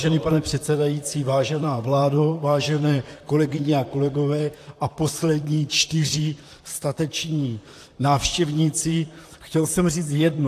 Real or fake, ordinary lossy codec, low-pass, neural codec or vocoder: fake; AAC, 64 kbps; 14.4 kHz; vocoder, 44.1 kHz, 128 mel bands, Pupu-Vocoder